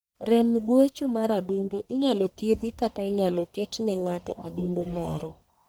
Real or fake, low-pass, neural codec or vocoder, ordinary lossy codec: fake; none; codec, 44.1 kHz, 1.7 kbps, Pupu-Codec; none